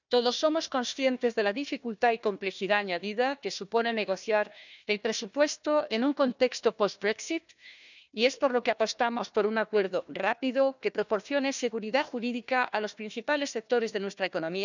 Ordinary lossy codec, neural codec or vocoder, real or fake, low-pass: none; codec, 16 kHz, 1 kbps, FunCodec, trained on Chinese and English, 50 frames a second; fake; 7.2 kHz